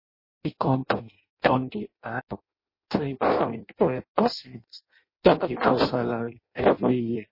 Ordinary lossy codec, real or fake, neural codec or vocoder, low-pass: MP3, 24 kbps; fake; codec, 16 kHz in and 24 kHz out, 0.6 kbps, FireRedTTS-2 codec; 5.4 kHz